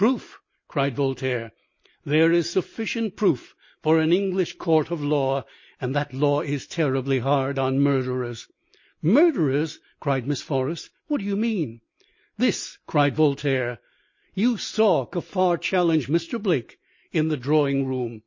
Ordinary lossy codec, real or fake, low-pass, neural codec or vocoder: MP3, 32 kbps; real; 7.2 kHz; none